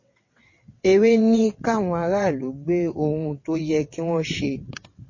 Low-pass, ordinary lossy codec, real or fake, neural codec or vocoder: 7.2 kHz; MP3, 32 kbps; fake; vocoder, 22.05 kHz, 80 mel bands, WaveNeXt